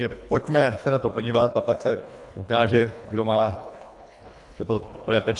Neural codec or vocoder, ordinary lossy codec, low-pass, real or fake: codec, 24 kHz, 1.5 kbps, HILCodec; AAC, 64 kbps; 10.8 kHz; fake